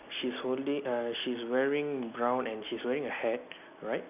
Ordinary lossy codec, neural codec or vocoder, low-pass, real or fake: none; none; 3.6 kHz; real